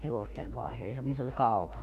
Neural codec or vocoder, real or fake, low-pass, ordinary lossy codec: autoencoder, 48 kHz, 32 numbers a frame, DAC-VAE, trained on Japanese speech; fake; 14.4 kHz; none